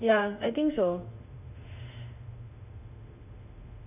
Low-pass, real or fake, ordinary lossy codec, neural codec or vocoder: 3.6 kHz; fake; none; autoencoder, 48 kHz, 32 numbers a frame, DAC-VAE, trained on Japanese speech